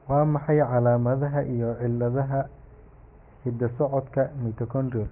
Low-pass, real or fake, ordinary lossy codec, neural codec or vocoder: 3.6 kHz; real; Opus, 32 kbps; none